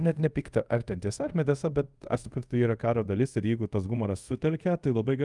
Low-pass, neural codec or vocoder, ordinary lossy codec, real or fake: 10.8 kHz; codec, 24 kHz, 0.5 kbps, DualCodec; Opus, 32 kbps; fake